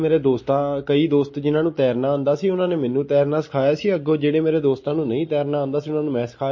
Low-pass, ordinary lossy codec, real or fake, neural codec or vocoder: 7.2 kHz; MP3, 32 kbps; real; none